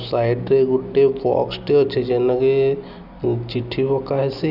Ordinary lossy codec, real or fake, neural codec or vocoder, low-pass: none; real; none; 5.4 kHz